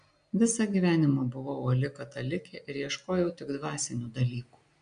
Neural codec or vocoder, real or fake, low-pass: none; real; 9.9 kHz